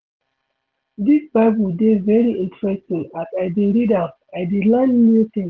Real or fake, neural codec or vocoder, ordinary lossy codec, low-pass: real; none; Opus, 16 kbps; 7.2 kHz